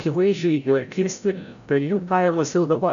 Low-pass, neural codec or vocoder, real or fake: 7.2 kHz; codec, 16 kHz, 0.5 kbps, FreqCodec, larger model; fake